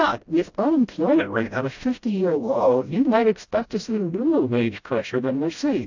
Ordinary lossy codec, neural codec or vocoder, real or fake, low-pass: AAC, 48 kbps; codec, 16 kHz, 0.5 kbps, FreqCodec, smaller model; fake; 7.2 kHz